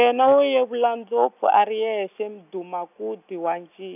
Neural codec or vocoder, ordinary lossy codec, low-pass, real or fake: none; none; 3.6 kHz; real